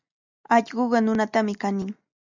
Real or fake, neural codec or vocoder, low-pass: real; none; 7.2 kHz